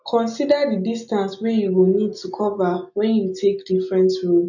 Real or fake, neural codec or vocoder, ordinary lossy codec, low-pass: real; none; none; 7.2 kHz